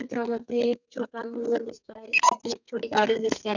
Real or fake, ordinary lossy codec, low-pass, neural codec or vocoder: fake; none; 7.2 kHz; codec, 32 kHz, 1.9 kbps, SNAC